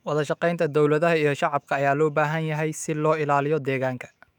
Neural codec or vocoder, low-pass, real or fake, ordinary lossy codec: autoencoder, 48 kHz, 128 numbers a frame, DAC-VAE, trained on Japanese speech; 19.8 kHz; fake; none